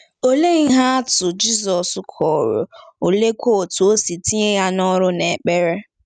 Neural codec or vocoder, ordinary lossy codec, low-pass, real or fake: none; none; 9.9 kHz; real